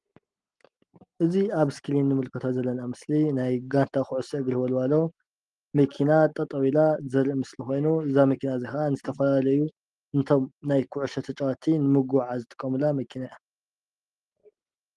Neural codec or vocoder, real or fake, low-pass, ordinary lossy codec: none; real; 10.8 kHz; Opus, 24 kbps